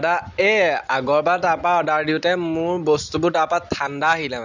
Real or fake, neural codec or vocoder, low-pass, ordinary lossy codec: real; none; 7.2 kHz; none